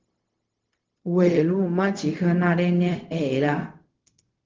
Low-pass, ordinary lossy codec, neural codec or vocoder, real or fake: 7.2 kHz; Opus, 16 kbps; codec, 16 kHz, 0.4 kbps, LongCat-Audio-Codec; fake